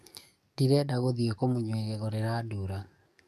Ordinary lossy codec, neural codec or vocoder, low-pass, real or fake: none; codec, 44.1 kHz, 7.8 kbps, DAC; 14.4 kHz; fake